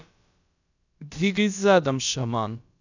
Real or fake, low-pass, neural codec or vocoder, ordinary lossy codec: fake; 7.2 kHz; codec, 16 kHz, about 1 kbps, DyCAST, with the encoder's durations; none